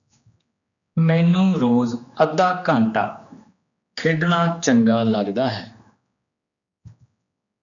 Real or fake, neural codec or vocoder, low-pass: fake; codec, 16 kHz, 2 kbps, X-Codec, HuBERT features, trained on general audio; 7.2 kHz